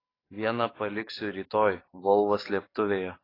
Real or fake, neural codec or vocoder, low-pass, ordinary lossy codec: fake; codec, 44.1 kHz, 7.8 kbps, DAC; 5.4 kHz; AAC, 24 kbps